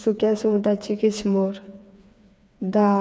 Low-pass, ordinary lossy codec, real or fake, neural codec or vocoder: none; none; fake; codec, 16 kHz, 4 kbps, FreqCodec, smaller model